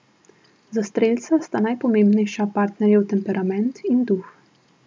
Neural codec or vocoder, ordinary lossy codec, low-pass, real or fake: none; none; none; real